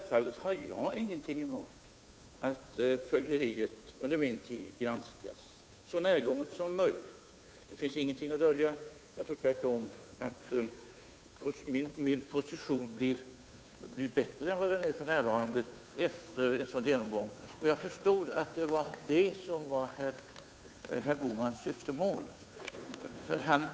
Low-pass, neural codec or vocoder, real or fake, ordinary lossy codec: none; codec, 16 kHz, 2 kbps, FunCodec, trained on Chinese and English, 25 frames a second; fake; none